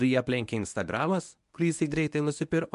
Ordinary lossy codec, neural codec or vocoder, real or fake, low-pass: MP3, 64 kbps; codec, 24 kHz, 0.9 kbps, WavTokenizer, medium speech release version 1; fake; 10.8 kHz